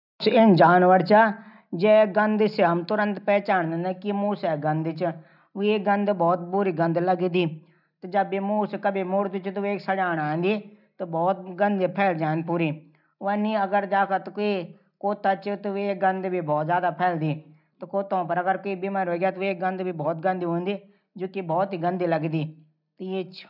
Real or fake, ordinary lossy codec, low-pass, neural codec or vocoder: real; none; 5.4 kHz; none